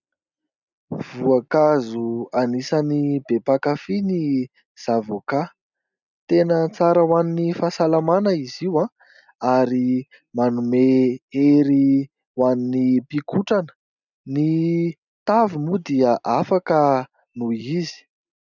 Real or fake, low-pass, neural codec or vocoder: real; 7.2 kHz; none